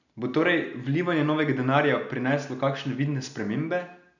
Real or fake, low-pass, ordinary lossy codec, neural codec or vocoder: real; 7.2 kHz; none; none